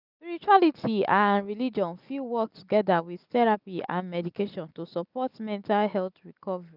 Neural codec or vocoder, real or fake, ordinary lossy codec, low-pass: none; real; none; 5.4 kHz